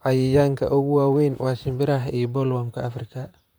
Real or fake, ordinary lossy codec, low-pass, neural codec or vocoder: fake; none; none; vocoder, 44.1 kHz, 128 mel bands, Pupu-Vocoder